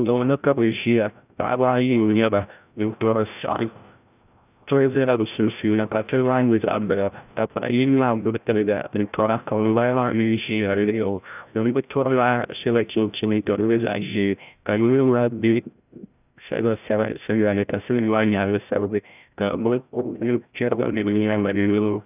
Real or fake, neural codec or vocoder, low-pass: fake; codec, 16 kHz, 0.5 kbps, FreqCodec, larger model; 3.6 kHz